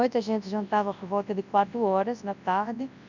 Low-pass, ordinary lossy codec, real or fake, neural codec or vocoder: 7.2 kHz; none; fake; codec, 24 kHz, 0.9 kbps, WavTokenizer, large speech release